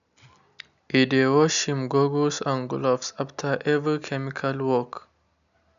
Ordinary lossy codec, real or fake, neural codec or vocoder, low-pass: none; real; none; 7.2 kHz